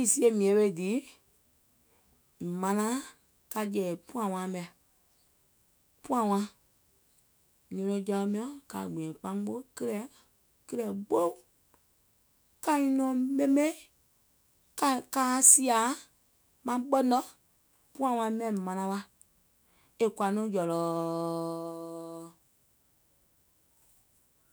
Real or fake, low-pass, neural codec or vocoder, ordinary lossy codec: fake; none; autoencoder, 48 kHz, 128 numbers a frame, DAC-VAE, trained on Japanese speech; none